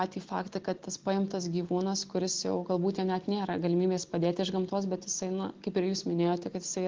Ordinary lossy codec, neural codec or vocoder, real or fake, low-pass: Opus, 16 kbps; none; real; 7.2 kHz